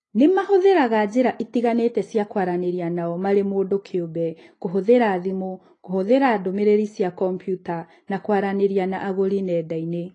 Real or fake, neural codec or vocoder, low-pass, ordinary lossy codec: real; none; 9.9 kHz; AAC, 32 kbps